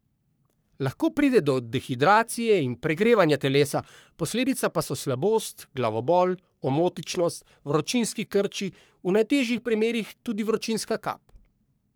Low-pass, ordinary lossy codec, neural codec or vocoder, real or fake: none; none; codec, 44.1 kHz, 3.4 kbps, Pupu-Codec; fake